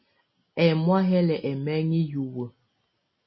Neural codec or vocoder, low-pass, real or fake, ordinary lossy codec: none; 7.2 kHz; real; MP3, 24 kbps